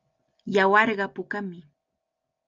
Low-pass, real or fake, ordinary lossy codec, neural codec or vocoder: 7.2 kHz; real; Opus, 24 kbps; none